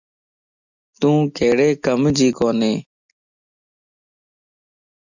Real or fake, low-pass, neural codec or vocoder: real; 7.2 kHz; none